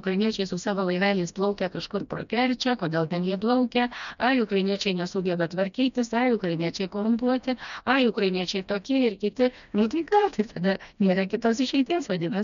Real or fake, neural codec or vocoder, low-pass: fake; codec, 16 kHz, 1 kbps, FreqCodec, smaller model; 7.2 kHz